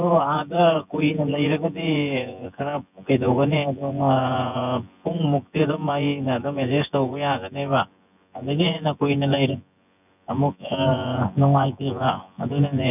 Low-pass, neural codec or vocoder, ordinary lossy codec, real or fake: 3.6 kHz; vocoder, 24 kHz, 100 mel bands, Vocos; none; fake